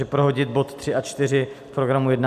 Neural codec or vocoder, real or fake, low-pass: none; real; 14.4 kHz